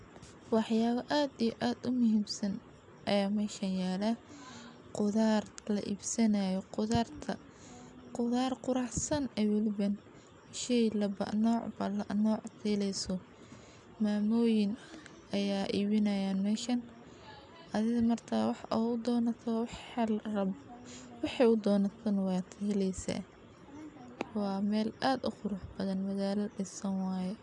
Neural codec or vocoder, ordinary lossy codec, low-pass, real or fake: none; none; 10.8 kHz; real